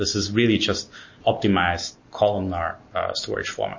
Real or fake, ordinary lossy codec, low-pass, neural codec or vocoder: real; MP3, 32 kbps; 7.2 kHz; none